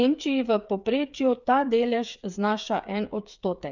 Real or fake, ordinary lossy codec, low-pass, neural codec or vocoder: fake; none; 7.2 kHz; codec, 16 kHz, 8 kbps, FreqCodec, smaller model